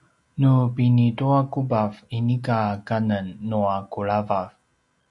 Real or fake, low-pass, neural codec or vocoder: real; 10.8 kHz; none